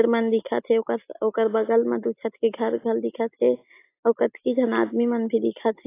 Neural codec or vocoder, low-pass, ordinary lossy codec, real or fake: none; 3.6 kHz; AAC, 24 kbps; real